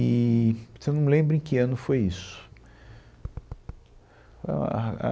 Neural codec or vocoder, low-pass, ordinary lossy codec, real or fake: none; none; none; real